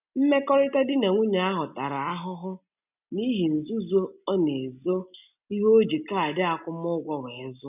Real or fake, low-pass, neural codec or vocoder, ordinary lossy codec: real; 3.6 kHz; none; none